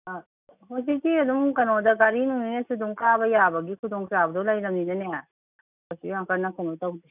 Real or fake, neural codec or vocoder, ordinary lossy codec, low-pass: real; none; none; 3.6 kHz